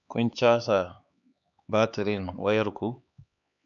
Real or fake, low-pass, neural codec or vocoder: fake; 7.2 kHz; codec, 16 kHz, 4 kbps, X-Codec, HuBERT features, trained on LibriSpeech